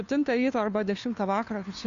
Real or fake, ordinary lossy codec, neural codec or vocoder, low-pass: fake; Opus, 64 kbps; codec, 16 kHz, 2 kbps, FunCodec, trained on Chinese and English, 25 frames a second; 7.2 kHz